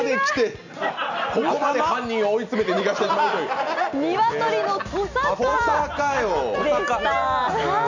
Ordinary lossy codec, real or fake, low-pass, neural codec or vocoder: none; real; 7.2 kHz; none